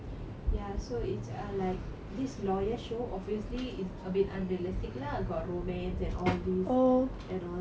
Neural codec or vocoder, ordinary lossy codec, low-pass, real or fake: none; none; none; real